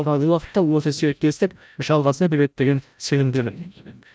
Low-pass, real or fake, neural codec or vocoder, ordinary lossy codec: none; fake; codec, 16 kHz, 0.5 kbps, FreqCodec, larger model; none